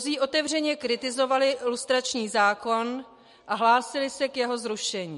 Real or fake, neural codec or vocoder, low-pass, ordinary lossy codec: real; none; 14.4 kHz; MP3, 48 kbps